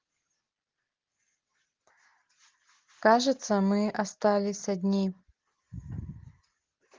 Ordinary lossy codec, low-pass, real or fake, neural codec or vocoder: Opus, 16 kbps; 7.2 kHz; real; none